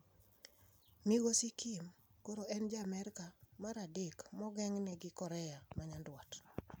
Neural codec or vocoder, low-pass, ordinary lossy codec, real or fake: none; none; none; real